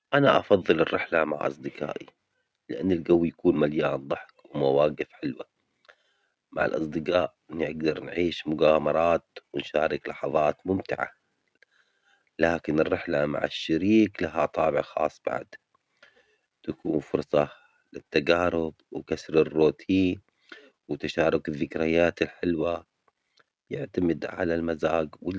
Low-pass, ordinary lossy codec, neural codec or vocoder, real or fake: none; none; none; real